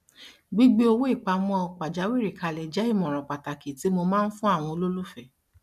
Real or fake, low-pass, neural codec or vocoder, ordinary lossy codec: real; 14.4 kHz; none; none